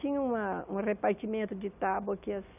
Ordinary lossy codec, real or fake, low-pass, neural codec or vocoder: none; real; 3.6 kHz; none